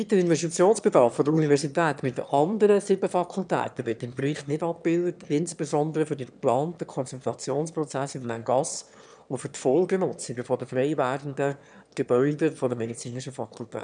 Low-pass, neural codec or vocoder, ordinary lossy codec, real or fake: 9.9 kHz; autoencoder, 22.05 kHz, a latent of 192 numbers a frame, VITS, trained on one speaker; none; fake